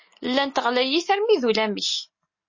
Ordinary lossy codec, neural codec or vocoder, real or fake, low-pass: MP3, 32 kbps; none; real; 7.2 kHz